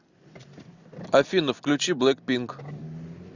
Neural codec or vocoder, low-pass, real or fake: none; 7.2 kHz; real